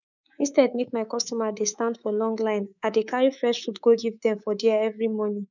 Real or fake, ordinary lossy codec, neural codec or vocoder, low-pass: fake; none; codec, 24 kHz, 3.1 kbps, DualCodec; 7.2 kHz